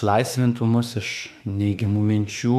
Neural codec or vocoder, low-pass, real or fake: autoencoder, 48 kHz, 32 numbers a frame, DAC-VAE, trained on Japanese speech; 14.4 kHz; fake